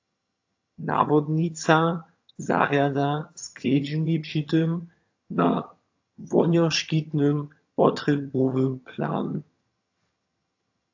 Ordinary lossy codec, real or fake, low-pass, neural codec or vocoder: AAC, 48 kbps; fake; 7.2 kHz; vocoder, 22.05 kHz, 80 mel bands, HiFi-GAN